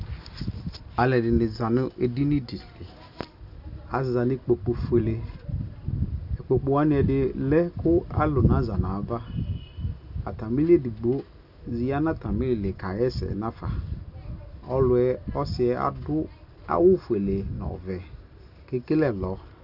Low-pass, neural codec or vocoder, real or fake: 5.4 kHz; none; real